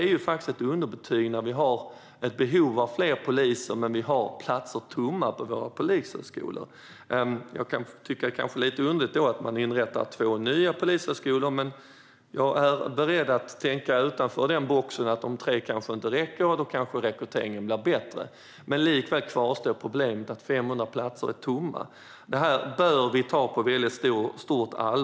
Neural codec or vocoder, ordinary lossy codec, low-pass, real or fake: none; none; none; real